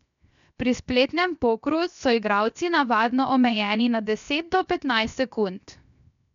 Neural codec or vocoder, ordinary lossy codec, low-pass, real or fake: codec, 16 kHz, 0.7 kbps, FocalCodec; none; 7.2 kHz; fake